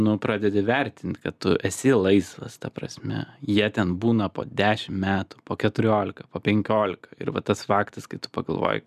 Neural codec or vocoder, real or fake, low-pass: none; real; 14.4 kHz